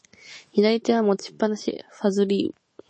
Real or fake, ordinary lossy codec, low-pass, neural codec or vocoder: fake; MP3, 32 kbps; 9.9 kHz; autoencoder, 48 kHz, 128 numbers a frame, DAC-VAE, trained on Japanese speech